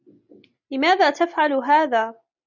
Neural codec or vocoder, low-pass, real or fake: none; 7.2 kHz; real